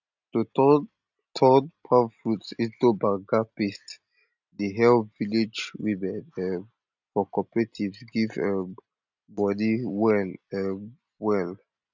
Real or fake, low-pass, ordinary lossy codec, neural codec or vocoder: real; 7.2 kHz; none; none